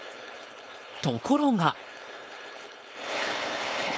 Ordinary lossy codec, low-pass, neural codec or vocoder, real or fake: none; none; codec, 16 kHz, 4.8 kbps, FACodec; fake